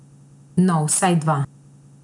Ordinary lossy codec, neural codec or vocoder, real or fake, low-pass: none; none; real; 10.8 kHz